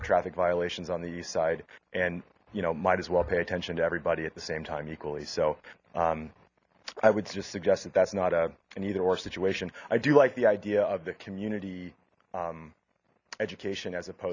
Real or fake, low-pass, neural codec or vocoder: real; 7.2 kHz; none